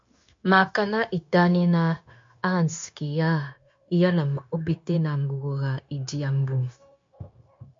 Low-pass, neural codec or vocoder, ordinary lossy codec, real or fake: 7.2 kHz; codec, 16 kHz, 0.9 kbps, LongCat-Audio-Codec; MP3, 48 kbps; fake